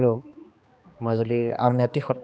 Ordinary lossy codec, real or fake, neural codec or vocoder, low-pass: none; fake; codec, 16 kHz, 2 kbps, X-Codec, HuBERT features, trained on balanced general audio; none